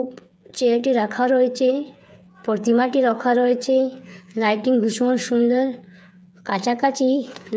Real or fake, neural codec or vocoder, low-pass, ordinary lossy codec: fake; codec, 16 kHz, 8 kbps, FreqCodec, smaller model; none; none